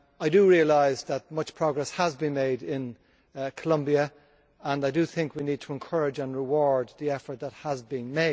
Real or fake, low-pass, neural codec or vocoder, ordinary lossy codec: real; none; none; none